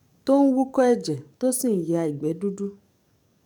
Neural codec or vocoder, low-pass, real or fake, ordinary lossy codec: codec, 44.1 kHz, 7.8 kbps, DAC; 19.8 kHz; fake; none